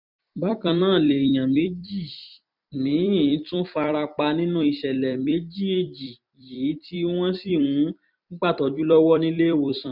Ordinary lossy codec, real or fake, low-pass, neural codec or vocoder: none; fake; 5.4 kHz; vocoder, 44.1 kHz, 128 mel bands every 256 samples, BigVGAN v2